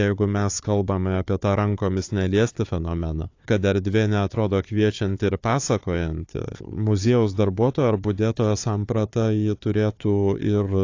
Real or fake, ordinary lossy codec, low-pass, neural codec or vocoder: fake; AAC, 48 kbps; 7.2 kHz; codec, 16 kHz, 4 kbps, FunCodec, trained on Chinese and English, 50 frames a second